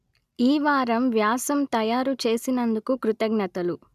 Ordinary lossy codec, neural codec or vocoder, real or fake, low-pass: none; none; real; 14.4 kHz